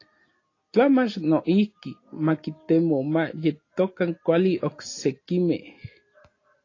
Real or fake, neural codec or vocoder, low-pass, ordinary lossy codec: real; none; 7.2 kHz; AAC, 32 kbps